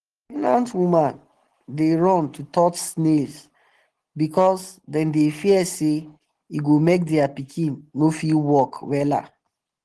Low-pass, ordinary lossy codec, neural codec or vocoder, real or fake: 10.8 kHz; Opus, 16 kbps; none; real